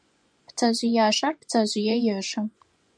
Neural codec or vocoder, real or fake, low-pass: vocoder, 24 kHz, 100 mel bands, Vocos; fake; 9.9 kHz